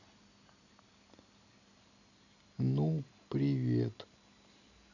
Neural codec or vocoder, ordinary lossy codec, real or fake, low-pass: none; none; real; 7.2 kHz